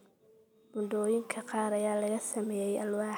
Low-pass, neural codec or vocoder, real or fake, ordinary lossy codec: none; none; real; none